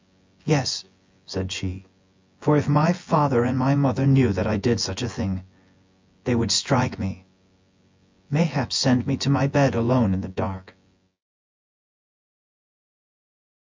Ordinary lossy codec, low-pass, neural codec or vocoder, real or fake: MP3, 64 kbps; 7.2 kHz; vocoder, 24 kHz, 100 mel bands, Vocos; fake